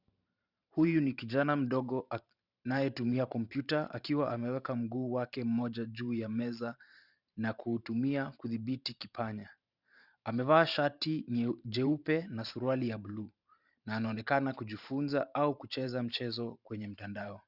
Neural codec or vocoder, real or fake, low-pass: vocoder, 44.1 kHz, 128 mel bands every 512 samples, BigVGAN v2; fake; 5.4 kHz